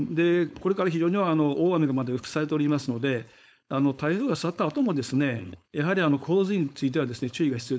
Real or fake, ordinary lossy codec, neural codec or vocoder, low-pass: fake; none; codec, 16 kHz, 4.8 kbps, FACodec; none